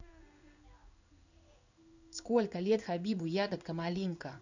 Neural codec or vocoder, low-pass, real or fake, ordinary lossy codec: codec, 16 kHz in and 24 kHz out, 1 kbps, XY-Tokenizer; 7.2 kHz; fake; none